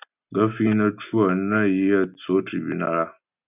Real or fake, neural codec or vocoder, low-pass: real; none; 3.6 kHz